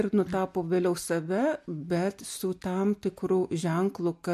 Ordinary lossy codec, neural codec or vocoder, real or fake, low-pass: MP3, 64 kbps; none; real; 14.4 kHz